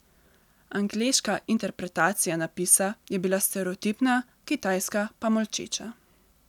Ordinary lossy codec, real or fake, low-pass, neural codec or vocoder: none; real; 19.8 kHz; none